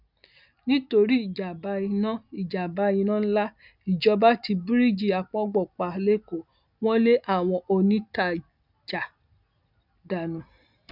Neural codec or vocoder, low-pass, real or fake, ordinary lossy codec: none; 5.4 kHz; real; none